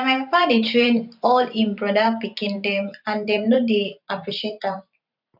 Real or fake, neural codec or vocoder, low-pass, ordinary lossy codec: fake; vocoder, 44.1 kHz, 128 mel bands every 512 samples, BigVGAN v2; 5.4 kHz; none